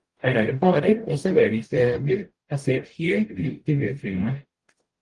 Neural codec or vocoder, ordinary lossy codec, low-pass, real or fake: codec, 44.1 kHz, 0.9 kbps, DAC; Opus, 24 kbps; 10.8 kHz; fake